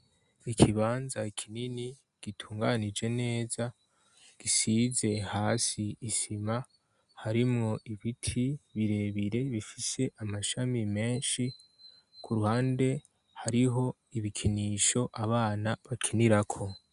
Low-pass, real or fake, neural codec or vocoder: 10.8 kHz; real; none